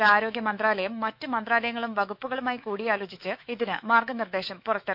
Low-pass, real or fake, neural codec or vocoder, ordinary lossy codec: 5.4 kHz; fake; autoencoder, 48 kHz, 128 numbers a frame, DAC-VAE, trained on Japanese speech; none